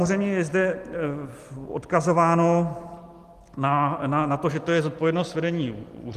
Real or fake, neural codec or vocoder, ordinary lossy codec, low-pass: real; none; Opus, 24 kbps; 14.4 kHz